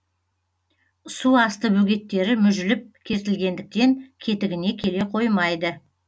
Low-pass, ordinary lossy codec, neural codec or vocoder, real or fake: none; none; none; real